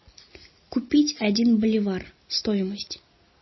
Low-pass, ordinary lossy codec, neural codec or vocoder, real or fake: 7.2 kHz; MP3, 24 kbps; none; real